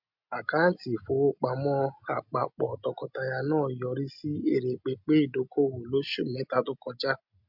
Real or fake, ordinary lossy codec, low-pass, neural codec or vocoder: real; MP3, 48 kbps; 5.4 kHz; none